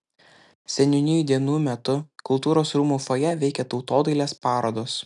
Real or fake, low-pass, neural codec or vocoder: real; 10.8 kHz; none